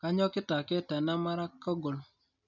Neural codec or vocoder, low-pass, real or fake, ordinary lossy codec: none; 7.2 kHz; real; none